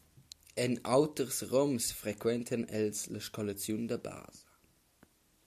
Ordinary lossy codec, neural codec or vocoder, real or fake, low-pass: AAC, 96 kbps; none; real; 14.4 kHz